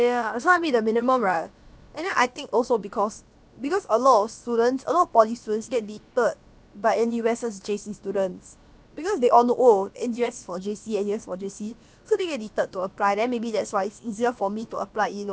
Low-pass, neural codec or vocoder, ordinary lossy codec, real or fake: none; codec, 16 kHz, about 1 kbps, DyCAST, with the encoder's durations; none; fake